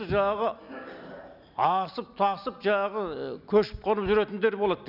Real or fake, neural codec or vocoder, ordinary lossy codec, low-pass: real; none; none; 5.4 kHz